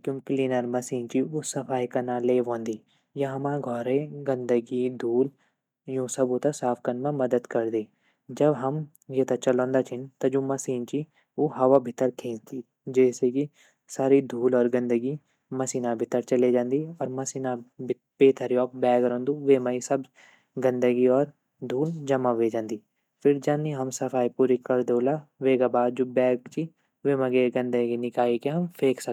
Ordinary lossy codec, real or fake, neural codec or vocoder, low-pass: none; real; none; 19.8 kHz